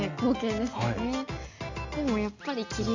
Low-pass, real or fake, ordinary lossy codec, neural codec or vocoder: 7.2 kHz; real; Opus, 64 kbps; none